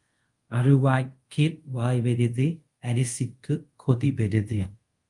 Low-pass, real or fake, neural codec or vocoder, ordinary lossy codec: 10.8 kHz; fake; codec, 24 kHz, 0.5 kbps, DualCodec; Opus, 32 kbps